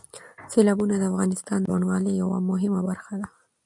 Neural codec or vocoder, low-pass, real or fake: none; 10.8 kHz; real